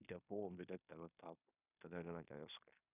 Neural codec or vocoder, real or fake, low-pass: codec, 16 kHz in and 24 kHz out, 0.9 kbps, LongCat-Audio-Codec, fine tuned four codebook decoder; fake; 3.6 kHz